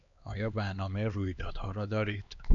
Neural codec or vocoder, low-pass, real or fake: codec, 16 kHz, 2 kbps, X-Codec, HuBERT features, trained on LibriSpeech; 7.2 kHz; fake